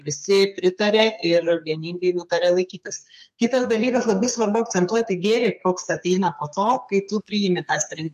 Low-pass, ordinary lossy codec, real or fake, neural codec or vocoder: 14.4 kHz; MP3, 64 kbps; fake; codec, 32 kHz, 1.9 kbps, SNAC